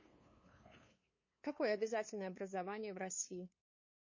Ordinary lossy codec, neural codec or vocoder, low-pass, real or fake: MP3, 32 kbps; codec, 16 kHz, 2 kbps, FunCodec, trained on LibriTTS, 25 frames a second; 7.2 kHz; fake